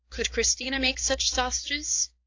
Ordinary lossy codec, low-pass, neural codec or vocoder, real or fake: AAC, 48 kbps; 7.2 kHz; codec, 16 kHz, 4 kbps, X-Codec, HuBERT features, trained on balanced general audio; fake